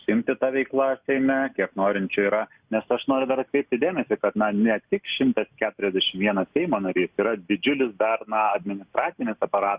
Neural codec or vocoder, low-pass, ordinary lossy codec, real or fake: none; 3.6 kHz; Opus, 64 kbps; real